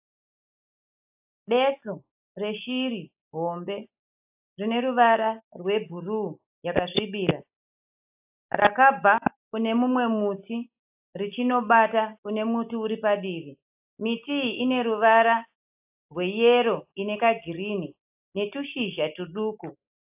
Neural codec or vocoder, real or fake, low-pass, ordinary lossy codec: none; real; 3.6 kHz; AAC, 32 kbps